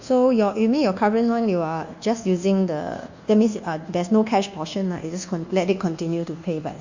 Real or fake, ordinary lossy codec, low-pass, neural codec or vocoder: fake; Opus, 64 kbps; 7.2 kHz; codec, 24 kHz, 1.2 kbps, DualCodec